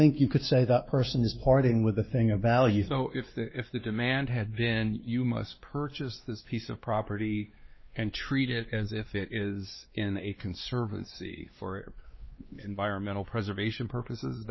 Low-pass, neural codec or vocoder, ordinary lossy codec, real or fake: 7.2 kHz; codec, 16 kHz, 2 kbps, X-Codec, WavLM features, trained on Multilingual LibriSpeech; MP3, 24 kbps; fake